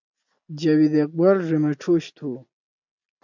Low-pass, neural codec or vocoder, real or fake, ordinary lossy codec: 7.2 kHz; none; real; MP3, 64 kbps